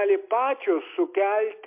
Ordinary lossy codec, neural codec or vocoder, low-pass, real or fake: MP3, 32 kbps; none; 3.6 kHz; real